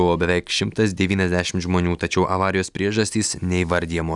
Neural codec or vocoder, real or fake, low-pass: none; real; 10.8 kHz